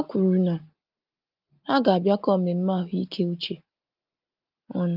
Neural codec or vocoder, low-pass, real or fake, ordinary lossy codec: none; 5.4 kHz; real; Opus, 32 kbps